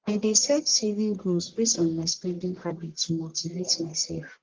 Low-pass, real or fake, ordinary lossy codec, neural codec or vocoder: 7.2 kHz; fake; Opus, 16 kbps; codec, 44.1 kHz, 1.7 kbps, Pupu-Codec